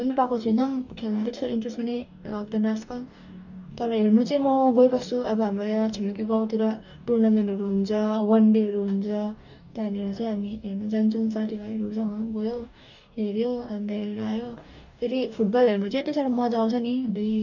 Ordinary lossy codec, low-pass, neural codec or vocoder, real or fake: none; 7.2 kHz; codec, 44.1 kHz, 2.6 kbps, DAC; fake